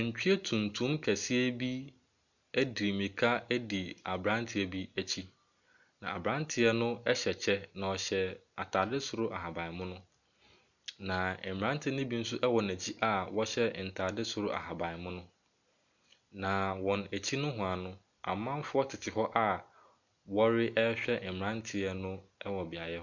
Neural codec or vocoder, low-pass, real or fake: none; 7.2 kHz; real